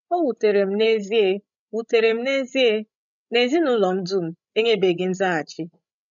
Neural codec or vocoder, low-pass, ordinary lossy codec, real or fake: codec, 16 kHz, 16 kbps, FreqCodec, larger model; 7.2 kHz; none; fake